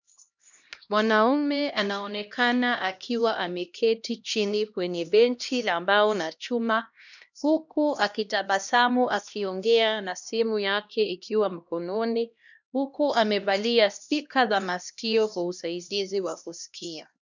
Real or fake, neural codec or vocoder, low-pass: fake; codec, 16 kHz, 1 kbps, X-Codec, HuBERT features, trained on LibriSpeech; 7.2 kHz